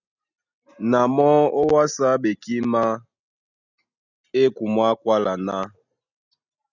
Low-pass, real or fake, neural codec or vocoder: 7.2 kHz; real; none